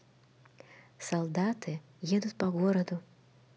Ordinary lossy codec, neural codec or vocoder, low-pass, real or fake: none; none; none; real